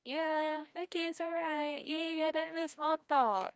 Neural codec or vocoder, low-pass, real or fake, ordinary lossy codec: codec, 16 kHz, 1 kbps, FreqCodec, larger model; none; fake; none